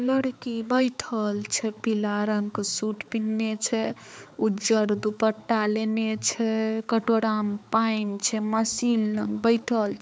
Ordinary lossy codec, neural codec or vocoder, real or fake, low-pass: none; codec, 16 kHz, 4 kbps, X-Codec, HuBERT features, trained on balanced general audio; fake; none